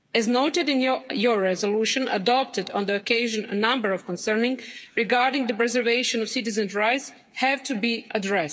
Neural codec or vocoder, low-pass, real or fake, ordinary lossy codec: codec, 16 kHz, 8 kbps, FreqCodec, smaller model; none; fake; none